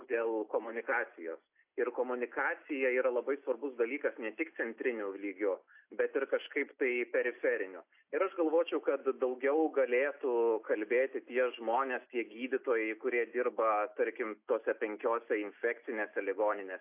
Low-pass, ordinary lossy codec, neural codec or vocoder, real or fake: 3.6 kHz; AAC, 32 kbps; none; real